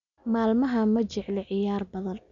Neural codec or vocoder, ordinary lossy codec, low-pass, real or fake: none; none; 7.2 kHz; real